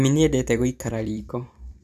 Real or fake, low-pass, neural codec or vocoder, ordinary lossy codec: fake; 14.4 kHz; vocoder, 44.1 kHz, 128 mel bands every 256 samples, BigVGAN v2; none